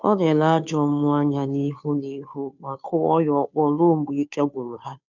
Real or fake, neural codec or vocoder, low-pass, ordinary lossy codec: fake; codec, 16 kHz, 2 kbps, FunCodec, trained on Chinese and English, 25 frames a second; 7.2 kHz; none